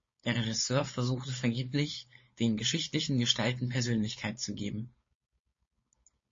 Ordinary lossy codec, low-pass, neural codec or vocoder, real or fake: MP3, 32 kbps; 7.2 kHz; codec, 16 kHz, 4.8 kbps, FACodec; fake